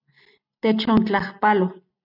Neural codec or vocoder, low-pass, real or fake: none; 5.4 kHz; real